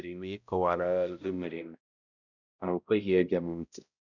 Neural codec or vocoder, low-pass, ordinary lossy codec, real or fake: codec, 16 kHz, 0.5 kbps, X-Codec, HuBERT features, trained on balanced general audio; 7.2 kHz; none; fake